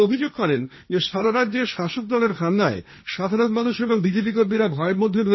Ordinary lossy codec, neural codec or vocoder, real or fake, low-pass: MP3, 24 kbps; codec, 16 kHz, 1.1 kbps, Voila-Tokenizer; fake; 7.2 kHz